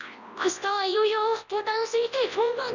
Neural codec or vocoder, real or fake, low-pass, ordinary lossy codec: codec, 24 kHz, 0.9 kbps, WavTokenizer, large speech release; fake; 7.2 kHz; none